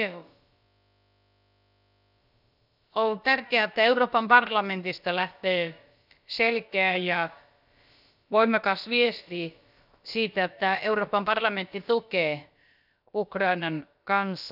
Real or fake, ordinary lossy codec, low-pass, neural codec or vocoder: fake; none; 5.4 kHz; codec, 16 kHz, about 1 kbps, DyCAST, with the encoder's durations